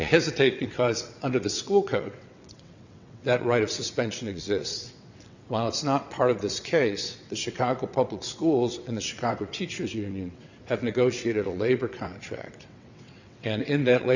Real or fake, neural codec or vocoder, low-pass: fake; vocoder, 22.05 kHz, 80 mel bands, WaveNeXt; 7.2 kHz